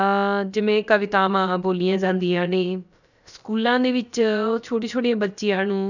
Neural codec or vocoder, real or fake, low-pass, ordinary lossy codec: codec, 16 kHz, about 1 kbps, DyCAST, with the encoder's durations; fake; 7.2 kHz; none